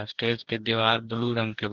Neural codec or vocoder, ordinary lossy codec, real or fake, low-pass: codec, 44.1 kHz, 2.6 kbps, DAC; Opus, 16 kbps; fake; 7.2 kHz